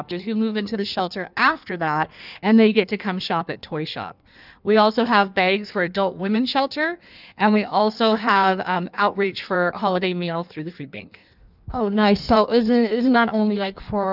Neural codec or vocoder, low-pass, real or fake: codec, 16 kHz in and 24 kHz out, 1.1 kbps, FireRedTTS-2 codec; 5.4 kHz; fake